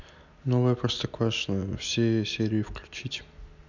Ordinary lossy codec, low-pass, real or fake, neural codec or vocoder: none; 7.2 kHz; real; none